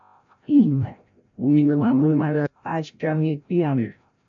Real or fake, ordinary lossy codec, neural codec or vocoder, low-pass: fake; AAC, 64 kbps; codec, 16 kHz, 0.5 kbps, FreqCodec, larger model; 7.2 kHz